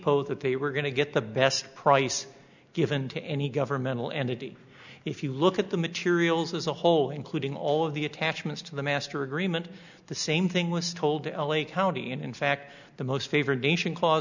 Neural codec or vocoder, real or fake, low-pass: none; real; 7.2 kHz